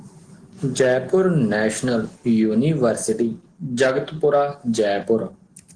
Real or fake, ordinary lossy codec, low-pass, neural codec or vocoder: real; Opus, 16 kbps; 9.9 kHz; none